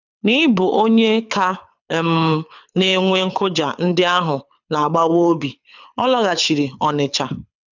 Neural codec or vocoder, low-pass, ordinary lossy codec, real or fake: codec, 24 kHz, 6 kbps, HILCodec; 7.2 kHz; none; fake